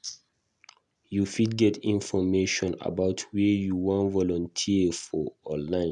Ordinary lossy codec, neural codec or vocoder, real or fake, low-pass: none; none; real; 10.8 kHz